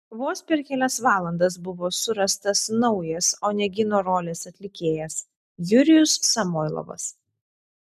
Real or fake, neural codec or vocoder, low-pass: real; none; 14.4 kHz